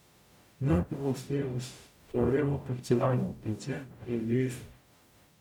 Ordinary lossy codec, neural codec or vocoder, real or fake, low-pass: none; codec, 44.1 kHz, 0.9 kbps, DAC; fake; 19.8 kHz